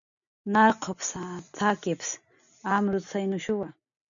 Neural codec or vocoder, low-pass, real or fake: none; 7.2 kHz; real